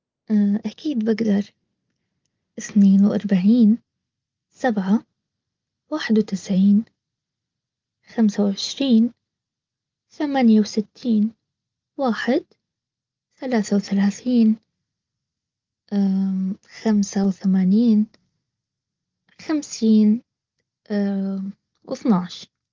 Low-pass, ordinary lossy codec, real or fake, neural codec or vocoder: 7.2 kHz; Opus, 32 kbps; real; none